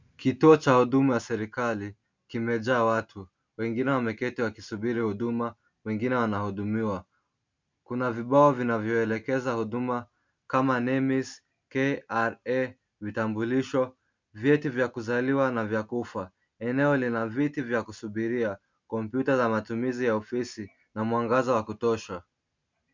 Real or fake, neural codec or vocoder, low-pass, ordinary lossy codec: real; none; 7.2 kHz; MP3, 64 kbps